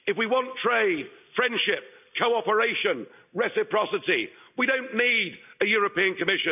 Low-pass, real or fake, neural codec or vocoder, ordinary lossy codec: 3.6 kHz; real; none; none